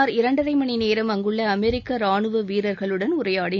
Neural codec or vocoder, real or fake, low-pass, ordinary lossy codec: none; real; 7.2 kHz; none